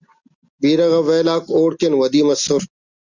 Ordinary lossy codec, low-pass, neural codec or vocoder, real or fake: Opus, 64 kbps; 7.2 kHz; none; real